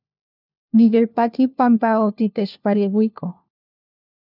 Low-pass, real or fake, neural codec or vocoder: 5.4 kHz; fake; codec, 16 kHz, 1 kbps, FunCodec, trained on LibriTTS, 50 frames a second